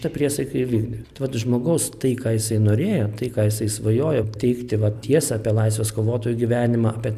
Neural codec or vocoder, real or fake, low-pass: none; real; 14.4 kHz